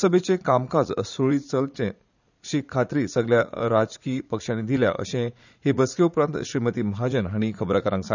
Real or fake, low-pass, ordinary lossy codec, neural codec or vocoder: fake; 7.2 kHz; none; vocoder, 44.1 kHz, 80 mel bands, Vocos